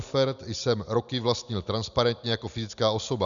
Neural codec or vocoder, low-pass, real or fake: none; 7.2 kHz; real